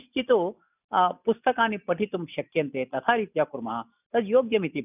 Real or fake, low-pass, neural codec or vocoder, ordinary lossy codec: real; 3.6 kHz; none; none